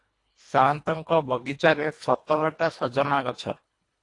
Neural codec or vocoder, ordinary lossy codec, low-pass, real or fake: codec, 24 kHz, 1.5 kbps, HILCodec; AAC, 48 kbps; 10.8 kHz; fake